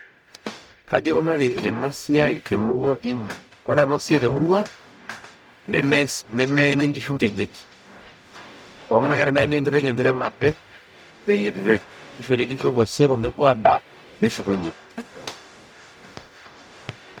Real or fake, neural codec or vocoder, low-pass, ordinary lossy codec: fake; codec, 44.1 kHz, 0.9 kbps, DAC; 19.8 kHz; none